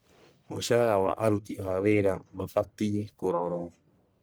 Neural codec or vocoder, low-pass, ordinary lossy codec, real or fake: codec, 44.1 kHz, 1.7 kbps, Pupu-Codec; none; none; fake